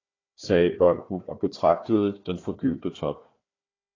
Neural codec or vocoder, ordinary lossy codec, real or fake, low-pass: codec, 16 kHz, 1 kbps, FunCodec, trained on Chinese and English, 50 frames a second; AAC, 32 kbps; fake; 7.2 kHz